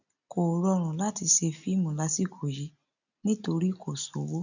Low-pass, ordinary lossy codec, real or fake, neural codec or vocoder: 7.2 kHz; none; real; none